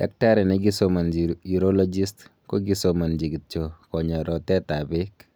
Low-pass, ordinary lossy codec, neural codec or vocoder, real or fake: none; none; none; real